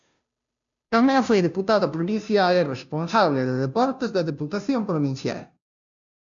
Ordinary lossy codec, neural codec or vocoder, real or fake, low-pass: MP3, 96 kbps; codec, 16 kHz, 0.5 kbps, FunCodec, trained on Chinese and English, 25 frames a second; fake; 7.2 kHz